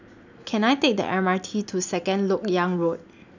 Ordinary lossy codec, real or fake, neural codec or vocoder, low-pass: none; real; none; 7.2 kHz